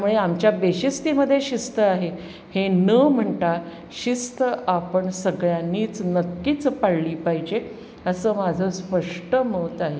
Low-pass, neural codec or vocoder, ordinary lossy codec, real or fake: none; none; none; real